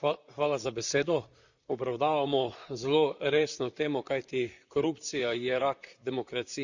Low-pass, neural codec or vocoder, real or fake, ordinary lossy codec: 7.2 kHz; vocoder, 44.1 kHz, 128 mel bands, Pupu-Vocoder; fake; Opus, 64 kbps